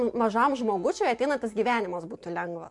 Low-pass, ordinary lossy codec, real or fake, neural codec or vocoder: 10.8 kHz; MP3, 64 kbps; real; none